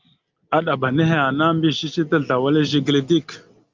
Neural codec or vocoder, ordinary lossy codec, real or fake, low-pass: none; Opus, 24 kbps; real; 7.2 kHz